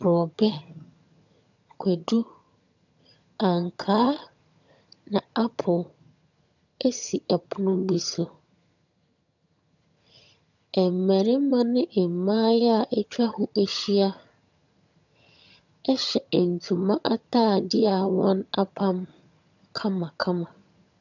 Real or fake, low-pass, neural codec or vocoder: fake; 7.2 kHz; vocoder, 22.05 kHz, 80 mel bands, HiFi-GAN